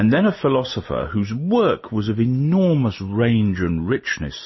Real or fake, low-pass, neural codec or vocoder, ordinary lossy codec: real; 7.2 kHz; none; MP3, 24 kbps